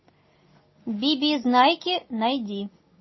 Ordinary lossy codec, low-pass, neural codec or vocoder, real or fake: MP3, 24 kbps; 7.2 kHz; none; real